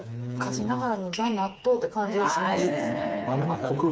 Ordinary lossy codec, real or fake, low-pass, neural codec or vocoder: none; fake; none; codec, 16 kHz, 4 kbps, FreqCodec, smaller model